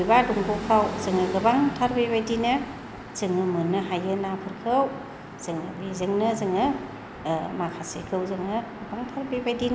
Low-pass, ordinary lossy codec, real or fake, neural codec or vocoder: none; none; real; none